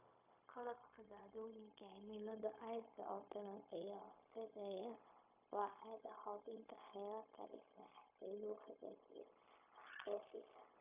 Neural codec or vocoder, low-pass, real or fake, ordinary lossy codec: codec, 16 kHz, 0.4 kbps, LongCat-Audio-Codec; 3.6 kHz; fake; AAC, 32 kbps